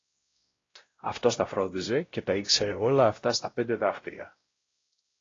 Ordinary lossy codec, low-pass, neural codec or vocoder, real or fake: AAC, 32 kbps; 7.2 kHz; codec, 16 kHz, 0.5 kbps, X-Codec, WavLM features, trained on Multilingual LibriSpeech; fake